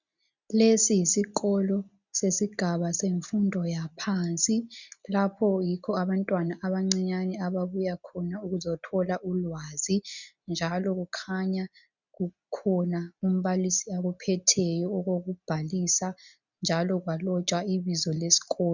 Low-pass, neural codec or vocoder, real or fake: 7.2 kHz; none; real